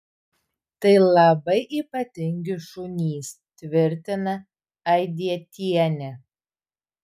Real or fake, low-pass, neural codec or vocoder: real; 14.4 kHz; none